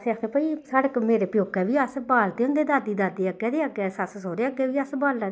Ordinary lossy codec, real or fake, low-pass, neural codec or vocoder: none; real; none; none